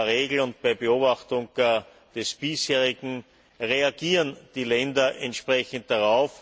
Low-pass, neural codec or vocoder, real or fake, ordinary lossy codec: none; none; real; none